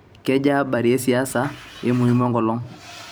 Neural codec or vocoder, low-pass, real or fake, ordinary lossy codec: vocoder, 44.1 kHz, 128 mel bands every 256 samples, BigVGAN v2; none; fake; none